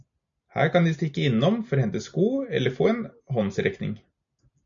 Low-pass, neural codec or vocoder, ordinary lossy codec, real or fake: 7.2 kHz; none; MP3, 64 kbps; real